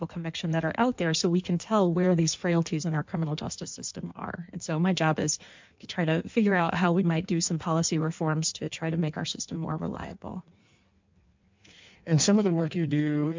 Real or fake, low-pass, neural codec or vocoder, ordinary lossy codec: fake; 7.2 kHz; codec, 16 kHz in and 24 kHz out, 1.1 kbps, FireRedTTS-2 codec; MP3, 64 kbps